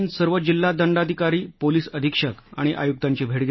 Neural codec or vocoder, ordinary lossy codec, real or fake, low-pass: none; MP3, 24 kbps; real; 7.2 kHz